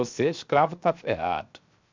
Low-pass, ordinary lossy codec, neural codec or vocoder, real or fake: 7.2 kHz; MP3, 64 kbps; codec, 16 kHz, 0.7 kbps, FocalCodec; fake